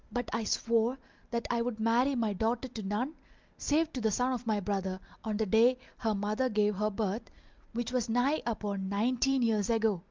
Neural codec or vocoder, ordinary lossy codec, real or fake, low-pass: none; Opus, 24 kbps; real; 7.2 kHz